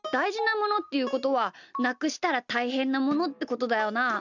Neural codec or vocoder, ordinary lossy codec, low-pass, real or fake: none; none; 7.2 kHz; real